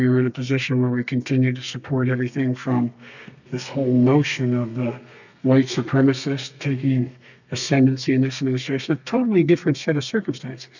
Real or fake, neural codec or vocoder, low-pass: fake; codec, 32 kHz, 1.9 kbps, SNAC; 7.2 kHz